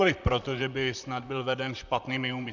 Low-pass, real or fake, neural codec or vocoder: 7.2 kHz; fake; vocoder, 44.1 kHz, 128 mel bands, Pupu-Vocoder